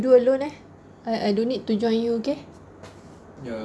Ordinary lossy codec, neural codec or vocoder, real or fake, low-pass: none; none; real; none